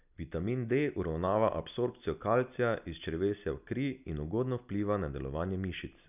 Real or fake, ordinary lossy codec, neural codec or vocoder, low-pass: real; none; none; 3.6 kHz